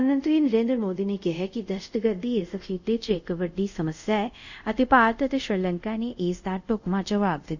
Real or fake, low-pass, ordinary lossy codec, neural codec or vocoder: fake; 7.2 kHz; none; codec, 24 kHz, 0.5 kbps, DualCodec